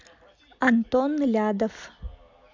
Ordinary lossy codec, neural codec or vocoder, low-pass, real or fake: MP3, 64 kbps; none; 7.2 kHz; real